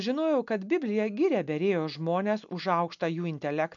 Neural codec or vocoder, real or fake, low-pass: none; real; 7.2 kHz